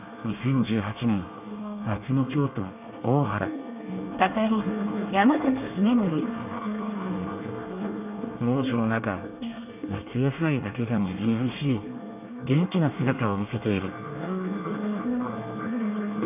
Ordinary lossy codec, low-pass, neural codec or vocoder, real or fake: none; 3.6 kHz; codec, 24 kHz, 1 kbps, SNAC; fake